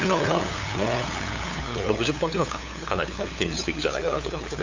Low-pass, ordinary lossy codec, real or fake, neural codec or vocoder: 7.2 kHz; AAC, 48 kbps; fake; codec, 16 kHz, 8 kbps, FunCodec, trained on LibriTTS, 25 frames a second